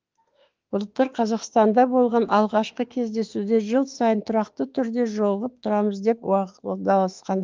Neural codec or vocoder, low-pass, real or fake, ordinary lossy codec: autoencoder, 48 kHz, 32 numbers a frame, DAC-VAE, trained on Japanese speech; 7.2 kHz; fake; Opus, 32 kbps